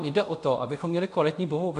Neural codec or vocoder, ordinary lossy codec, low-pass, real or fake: codec, 24 kHz, 0.5 kbps, DualCodec; AAC, 96 kbps; 10.8 kHz; fake